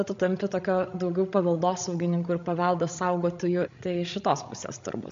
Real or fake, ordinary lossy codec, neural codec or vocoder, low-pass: fake; MP3, 48 kbps; codec, 16 kHz, 16 kbps, FreqCodec, larger model; 7.2 kHz